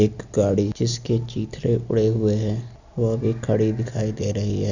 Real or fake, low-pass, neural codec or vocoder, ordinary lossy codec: real; 7.2 kHz; none; none